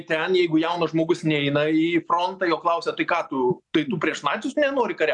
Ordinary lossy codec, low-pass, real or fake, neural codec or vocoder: MP3, 96 kbps; 10.8 kHz; real; none